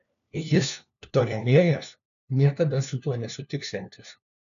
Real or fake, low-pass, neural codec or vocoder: fake; 7.2 kHz; codec, 16 kHz, 1 kbps, FunCodec, trained on LibriTTS, 50 frames a second